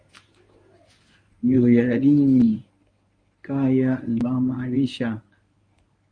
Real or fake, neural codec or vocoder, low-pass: fake; codec, 24 kHz, 0.9 kbps, WavTokenizer, medium speech release version 1; 9.9 kHz